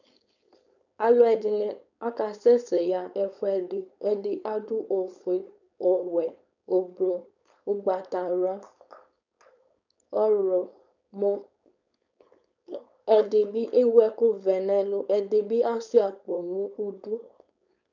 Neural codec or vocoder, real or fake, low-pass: codec, 16 kHz, 4.8 kbps, FACodec; fake; 7.2 kHz